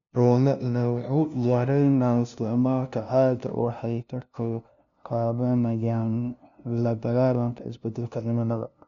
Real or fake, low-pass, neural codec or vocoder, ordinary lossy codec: fake; 7.2 kHz; codec, 16 kHz, 0.5 kbps, FunCodec, trained on LibriTTS, 25 frames a second; none